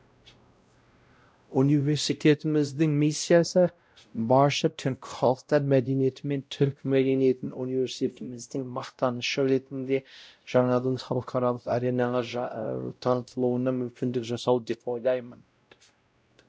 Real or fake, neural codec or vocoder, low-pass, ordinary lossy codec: fake; codec, 16 kHz, 0.5 kbps, X-Codec, WavLM features, trained on Multilingual LibriSpeech; none; none